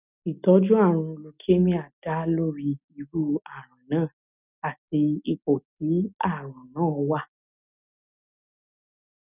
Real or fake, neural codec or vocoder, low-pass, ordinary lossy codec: real; none; 3.6 kHz; none